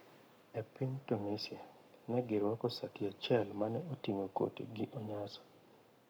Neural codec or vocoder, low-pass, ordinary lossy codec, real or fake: codec, 44.1 kHz, 7.8 kbps, Pupu-Codec; none; none; fake